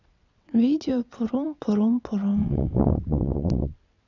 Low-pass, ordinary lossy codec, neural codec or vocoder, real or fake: 7.2 kHz; none; vocoder, 22.05 kHz, 80 mel bands, WaveNeXt; fake